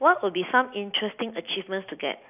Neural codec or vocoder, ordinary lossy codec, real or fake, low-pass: none; none; real; 3.6 kHz